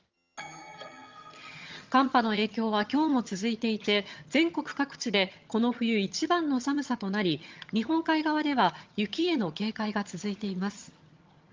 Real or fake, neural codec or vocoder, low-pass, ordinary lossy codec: fake; vocoder, 22.05 kHz, 80 mel bands, HiFi-GAN; 7.2 kHz; Opus, 32 kbps